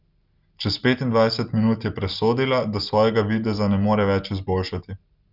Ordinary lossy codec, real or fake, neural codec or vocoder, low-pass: Opus, 24 kbps; real; none; 5.4 kHz